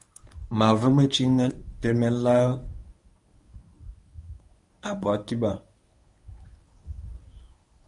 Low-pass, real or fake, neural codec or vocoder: 10.8 kHz; fake; codec, 24 kHz, 0.9 kbps, WavTokenizer, medium speech release version 1